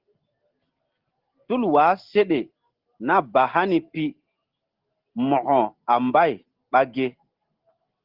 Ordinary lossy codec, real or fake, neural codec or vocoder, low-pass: Opus, 16 kbps; real; none; 5.4 kHz